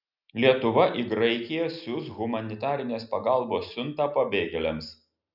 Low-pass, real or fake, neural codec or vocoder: 5.4 kHz; real; none